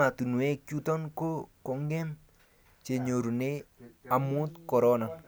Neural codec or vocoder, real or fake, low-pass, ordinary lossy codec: none; real; none; none